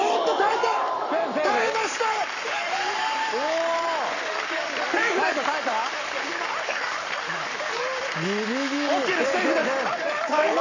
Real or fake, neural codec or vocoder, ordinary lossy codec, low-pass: fake; codec, 44.1 kHz, 7.8 kbps, Pupu-Codec; none; 7.2 kHz